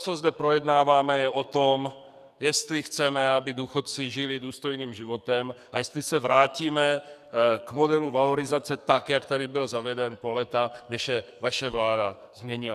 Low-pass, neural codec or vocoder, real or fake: 14.4 kHz; codec, 44.1 kHz, 2.6 kbps, SNAC; fake